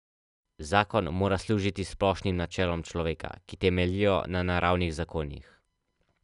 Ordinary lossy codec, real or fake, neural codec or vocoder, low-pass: none; real; none; 10.8 kHz